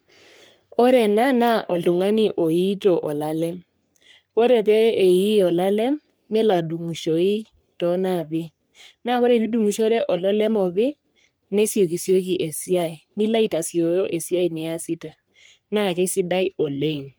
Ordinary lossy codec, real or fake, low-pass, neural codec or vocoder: none; fake; none; codec, 44.1 kHz, 3.4 kbps, Pupu-Codec